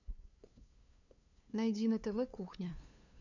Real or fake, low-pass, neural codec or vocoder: fake; 7.2 kHz; codec, 16 kHz, 2 kbps, FunCodec, trained on LibriTTS, 25 frames a second